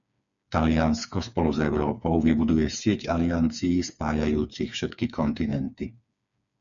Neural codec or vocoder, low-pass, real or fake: codec, 16 kHz, 4 kbps, FreqCodec, smaller model; 7.2 kHz; fake